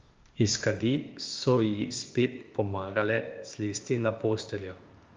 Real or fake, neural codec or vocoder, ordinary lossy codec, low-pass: fake; codec, 16 kHz, 0.8 kbps, ZipCodec; Opus, 32 kbps; 7.2 kHz